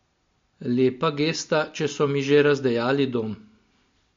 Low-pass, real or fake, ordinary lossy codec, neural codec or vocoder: 7.2 kHz; real; MP3, 48 kbps; none